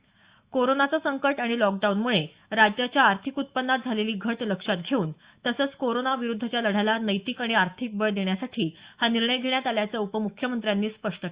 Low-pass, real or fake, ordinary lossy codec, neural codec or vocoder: 3.6 kHz; fake; Opus, 64 kbps; autoencoder, 48 kHz, 128 numbers a frame, DAC-VAE, trained on Japanese speech